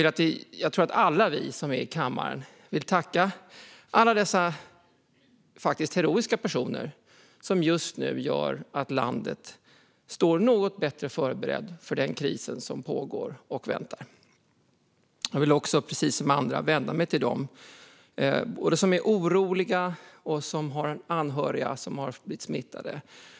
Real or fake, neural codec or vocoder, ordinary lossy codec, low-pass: real; none; none; none